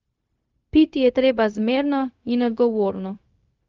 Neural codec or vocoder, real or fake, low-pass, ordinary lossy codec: codec, 16 kHz, 0.4 kbps, LongCat-Audio-Codec; fake; 7.2 kHz; Opus, 32 kbps